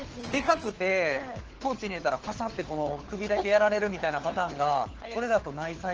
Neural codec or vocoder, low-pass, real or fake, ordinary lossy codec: codec, 16 kHz, 4 kbps, FunCodec, trained on LibriTTS, 50 frames a second; 7.2 kHz; fake; Opus, 16 kbps